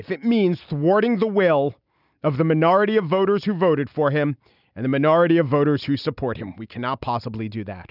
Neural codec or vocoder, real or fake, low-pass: none; real; 5.4 kHz